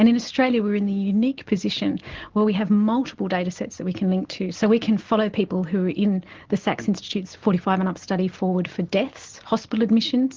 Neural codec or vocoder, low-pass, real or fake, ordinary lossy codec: none; 7.2 kHz; real; Opus, 16 kbps